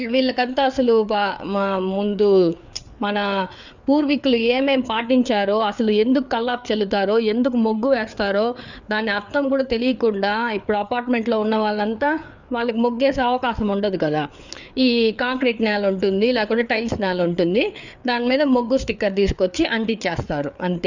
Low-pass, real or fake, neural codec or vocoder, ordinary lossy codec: 7.2 kHz; fake; codec, 16 kHz, 4 kbps, FreqCodec, larger model; none